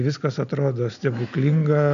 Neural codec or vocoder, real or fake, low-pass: none; real; 7.2 kHz